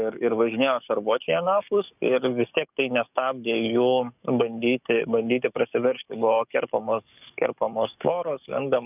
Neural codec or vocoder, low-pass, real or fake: codec, 44.1 kHz, 7.8 kbps, DAC; 3.6 kHz; fake